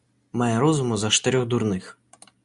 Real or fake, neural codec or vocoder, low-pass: real; none; 10.8 kHz